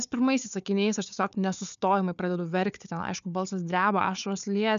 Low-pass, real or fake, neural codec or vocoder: 7.2 kHz; fake; codec, 16 kHz, 4 kbps, FunCodec, trained on Chinese and English, 50 frames a second